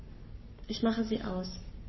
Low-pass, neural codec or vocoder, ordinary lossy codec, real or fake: 7.2 kHz; codec, 44.1 kHz, 7.8 kbps, Pupu-Codec; MP3, 24 kbps; fake